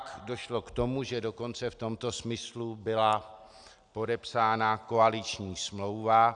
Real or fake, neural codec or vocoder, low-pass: real; none; 9.9 kHz